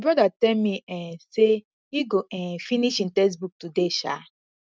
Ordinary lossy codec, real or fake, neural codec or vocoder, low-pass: none; real; none; none